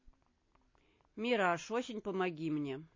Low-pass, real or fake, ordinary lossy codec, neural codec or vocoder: 7.2 kHz; real; MP3, 32 kbps; none